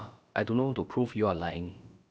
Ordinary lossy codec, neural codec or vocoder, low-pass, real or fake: none; codec, 16 kHz, about 1 kbps, DyCAST, with the encoder's durations; none; fake